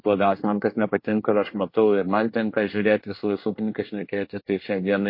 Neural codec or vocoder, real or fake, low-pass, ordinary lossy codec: codec, 24 kHz, 1 kbps, SNAC; fake; 5.4 kHz; MP3, 32 kbps